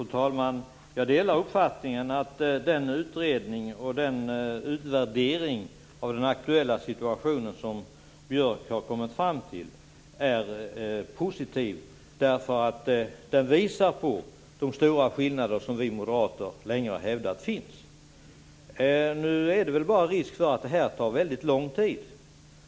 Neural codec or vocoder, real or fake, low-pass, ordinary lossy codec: none; real; none; none